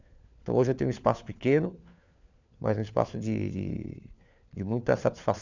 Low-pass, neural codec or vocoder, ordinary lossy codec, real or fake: 7.2 kHz; codec, 16 kHz, 4 kbps, FunCodec, trained on LibriTTS, 50 frames a second; none; fake